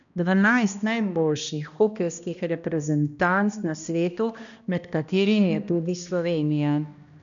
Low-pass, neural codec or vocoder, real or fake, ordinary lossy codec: 7.2 kHz; codec, 16 kHz, 1 kbps, X-Codec, HuBERT features, trained on balanced general audio; fake; none